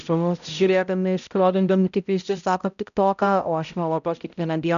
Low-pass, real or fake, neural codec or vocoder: 7.2 kHz; fake; codec, 16 kHz, 0.5 kbps, X-Codec, HuBERT features, trained on balanced general audio